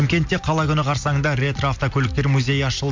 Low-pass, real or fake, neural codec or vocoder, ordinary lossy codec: 7.2 kHz; real; none; MP3, 64 kbps